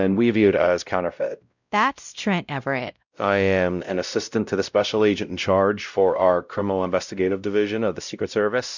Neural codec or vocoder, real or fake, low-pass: codec, 16 kHz, 0.5 kbps, X-Codec, WavLM features, trained on Multilingual LibriSpeech; fake; 7.2 kHz